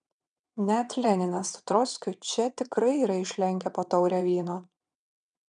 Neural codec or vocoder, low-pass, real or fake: vocoder, 22.05 kHz, 80 mel bands, WaveNeXt; 9.9 kHz; fake